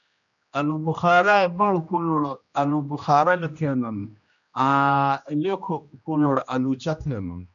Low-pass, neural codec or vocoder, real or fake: 7.2 kHz; codec, 16 kHz, 1 kbps, X-Codec, HuBERT features, trained on general audio; fake